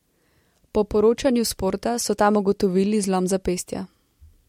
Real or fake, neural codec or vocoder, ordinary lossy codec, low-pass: real; none; MP3, 64 kbps; 19.8 kHz